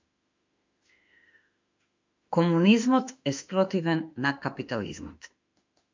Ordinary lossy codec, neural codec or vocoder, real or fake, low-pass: MP3, 64 kbps; autoencoder, 48 kHz, 32 numbers a frame, DAC-VAE, trained on Japanese speech; fake; 7.2 kHz